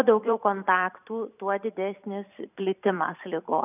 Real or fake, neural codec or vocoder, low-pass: fake; vocoder, 44.1 kHz, 128 mel bands every 512 samples, BigVGAN v2; 3.6 kHz